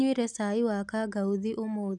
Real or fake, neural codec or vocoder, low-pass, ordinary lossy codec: real; none; none; none